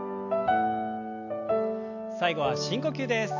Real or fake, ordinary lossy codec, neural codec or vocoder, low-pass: real; AAC, 48 kbps; none; 7.2 kHz